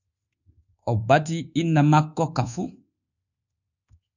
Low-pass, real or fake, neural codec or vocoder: 7.2 kHz; fake; codec, 24 kHz, 1.2 kbps, DualCodec